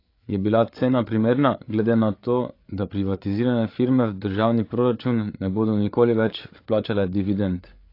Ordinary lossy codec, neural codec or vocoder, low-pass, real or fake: AAC, 32 kbps; codec, 16 kHz, 8 kbps, FreqCodec, larger model; 5.4 kHz; fake